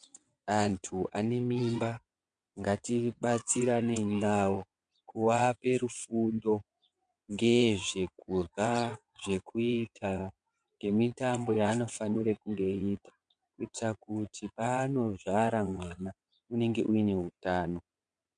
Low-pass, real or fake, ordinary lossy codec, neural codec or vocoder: 9.9 kHz; fake; MP3, 64 kbps; vocoder, 22.05 kHz, 80 mel bands, WaveNeXt